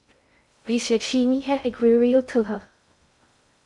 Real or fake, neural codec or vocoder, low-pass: fake; codec, 16 kHz in and 24 kHz out, 0.6 kbps, FocalCodec, streaming, 2048 codes; 10.8 kHz